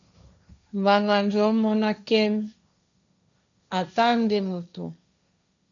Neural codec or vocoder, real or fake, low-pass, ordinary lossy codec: codec, 16 kHz, 1.1 kbps, Voila-Tokenizer; fake; 7.2 kHz; MP3, 96 kbps